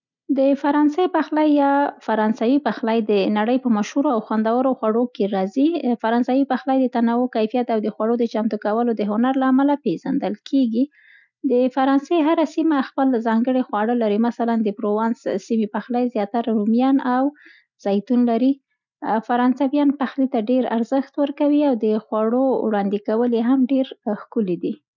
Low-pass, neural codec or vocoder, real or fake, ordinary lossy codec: 7.2 kHz; none; real; none